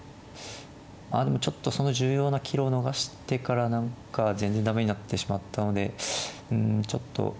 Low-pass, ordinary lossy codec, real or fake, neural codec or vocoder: none; none; real; none